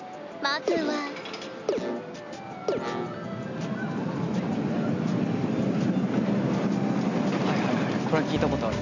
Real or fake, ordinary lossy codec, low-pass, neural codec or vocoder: real; none; 7.2 kHz; none